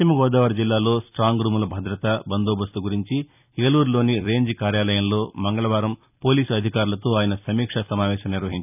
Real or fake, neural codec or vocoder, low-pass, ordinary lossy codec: fake; vocoder, 44.1 kHz, 128 mel bands every 256 samples, BigVGAN v2; 3.6 kHz; none